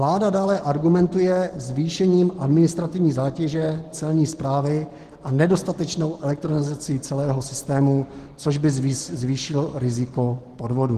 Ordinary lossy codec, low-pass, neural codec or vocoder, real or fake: Opus, 16 kbps; 14.4 kHz; none; real